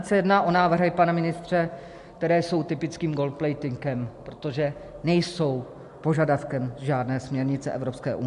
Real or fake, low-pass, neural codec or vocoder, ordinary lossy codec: real; 10.8 kHz; none; MP3, 64 kbps